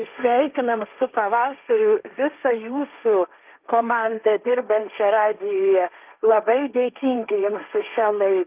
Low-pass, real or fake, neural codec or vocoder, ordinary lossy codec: 3.6 kHz; fake; codec, 16 kHz, 1.1 kbps, Voila-Tokenizer; Opus, 24 kbps